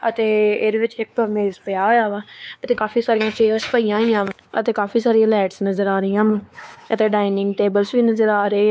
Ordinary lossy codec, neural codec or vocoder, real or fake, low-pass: none; codec, 16 kHz, 4 kbps, X-Codec, WavLM features, trained on Multilingual LibriSpeech; fake; none